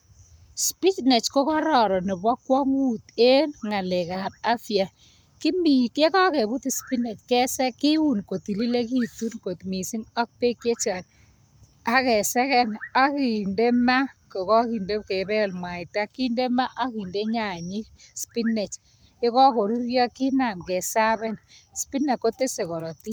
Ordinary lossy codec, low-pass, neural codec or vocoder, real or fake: none; none; codec, 44.1 kHz, 7.8 kbps, Pupu-Codec; fake